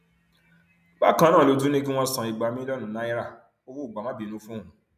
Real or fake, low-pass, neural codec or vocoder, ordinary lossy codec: real; 14.4 kHz; none; none